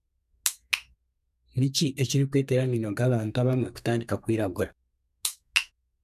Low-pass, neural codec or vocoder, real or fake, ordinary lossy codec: 14.4 kHz; codec, 32 kHz, 1.9 kbps, SNAC; fake; none